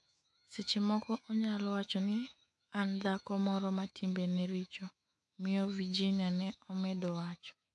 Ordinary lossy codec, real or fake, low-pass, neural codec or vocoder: AAC, 64 kbps; fake; 10.8 kHz; autoencoder, 48 kHz, 128 numbers a frame, DAC-VAE, trained on Japanese speech